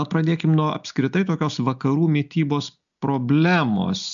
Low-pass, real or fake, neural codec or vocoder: 7.2 kHz; real; none